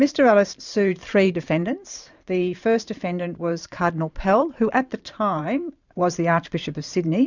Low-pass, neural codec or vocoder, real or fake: 7.2 kHz; vocoder, 44.1 kHz, 128 mel bands every 512 samples, BigVGAN v2; fake